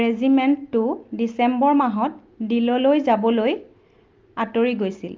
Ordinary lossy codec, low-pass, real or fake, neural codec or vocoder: Opus, 24 kbps; 7.2 kHz; real; none